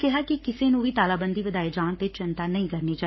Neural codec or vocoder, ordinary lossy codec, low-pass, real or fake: codec, 44.1 kHz, 7.8 kbps, Pupu-Codec; MP3, 24 kbps; 7.2 kHz; fake